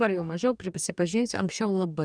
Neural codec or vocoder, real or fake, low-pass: codec, 44.1 kHz, 2.6 kbps, DAC; fake; 9.9 kHz